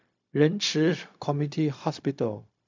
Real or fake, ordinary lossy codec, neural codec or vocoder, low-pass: fake; MP3, 64 kbps; codec, 16 kHz, 0.4 kbps, LongCat-Audio-Codec; 7.2 kHz